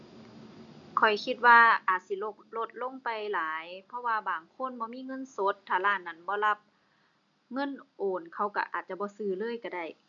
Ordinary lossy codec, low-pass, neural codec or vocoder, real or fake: none; 7.2 kHz; none; real